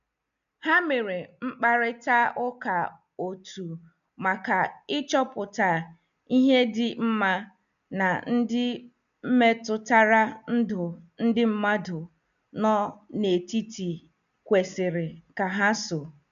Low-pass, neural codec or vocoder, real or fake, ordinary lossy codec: 7.2 kHz; none; real; none